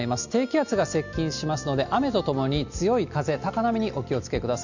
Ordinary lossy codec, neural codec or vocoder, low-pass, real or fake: none; none; 7.2 kHz; real